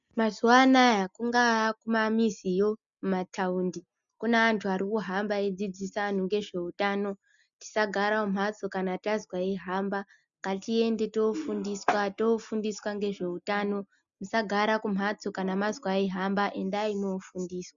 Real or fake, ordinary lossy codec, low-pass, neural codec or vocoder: real; MP3, 96 kbps; 7.2 kHz; none